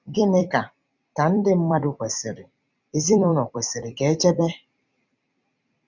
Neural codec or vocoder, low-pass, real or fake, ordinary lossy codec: vocoder, 44.1 kHz, 128 mel bands every 256 samples, BigVGAN v2; 7.2 kHz; fake; none